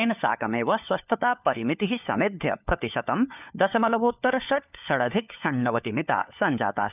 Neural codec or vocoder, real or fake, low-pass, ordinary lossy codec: codec, 16 kHz, 4 kbps, FunCodec, trained on LibriTTS, 50 frames a second; fake; 3.6 kHz; none